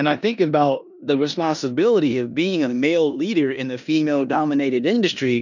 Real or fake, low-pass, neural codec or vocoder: fake; 7.2 kHz; codec, 16 kHz in and 24 kHz out, 0.9 kbps, LongCat-Audio-Codec, four codebook decoder